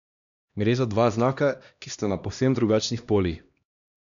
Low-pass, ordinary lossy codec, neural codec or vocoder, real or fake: 7.2 kHz; none; codec, 16 kHz, 1 kbps, X-Codec, HuBERT features, trained on LibriSpeech; fake